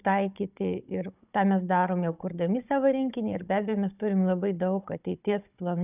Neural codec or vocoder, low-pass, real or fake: codec, 16 kHz, 16 kbps, FunCodec, trained on Chinese and English, 50 frames a second; 3.6 kHz; fake